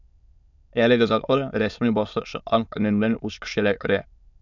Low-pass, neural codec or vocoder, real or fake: 7.2 kHz; autoencoder, 22.05 kHz, a latent of 192 numbers a frame, VITS, trained on many speakers; fake